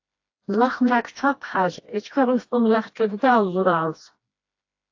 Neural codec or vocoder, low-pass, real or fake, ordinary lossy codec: codec, 16 kHz, 1 kbps, FreqCodec, smaller model; 7.2 kHz; fake; AAC, 48 kbps